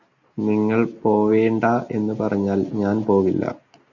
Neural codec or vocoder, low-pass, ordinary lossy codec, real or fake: none; 7.2 kHz; Opus, 64 kbps; real